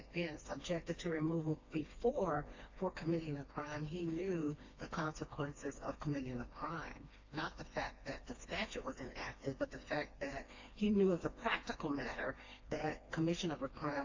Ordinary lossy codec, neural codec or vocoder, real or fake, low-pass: AAC, 48 kbps; codec, 16 kHz, 2 kbps, FreqCodec, smaller model; fake; 7.2 kHz